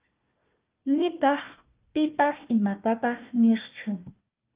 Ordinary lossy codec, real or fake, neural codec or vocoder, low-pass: Opus, 24 kbps; fake; codec, 16 kHz, 1 kbps, FunCodec, trained on Chinese and English, 50 frames a second; 3.6 kHz